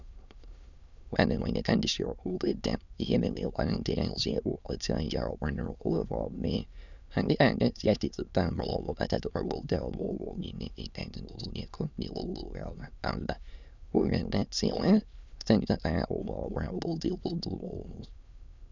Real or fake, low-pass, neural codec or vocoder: fake; 7.2 kHz; autoencoder, 22.05 kHz, a latent of 192 numbers a frame, VITS, trained on many speakers